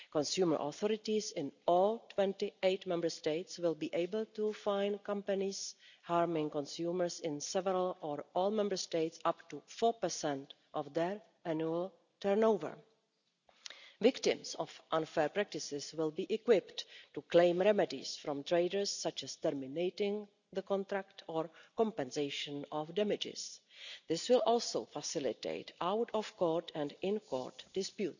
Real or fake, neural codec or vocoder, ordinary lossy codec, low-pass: real; none; none; 7.2 kHz